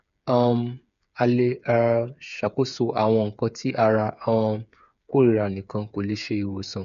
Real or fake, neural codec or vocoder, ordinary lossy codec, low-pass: fake; codec, 16 kHz, 8 kbps, FreqCodec, smaller model; none; 7.2 kHz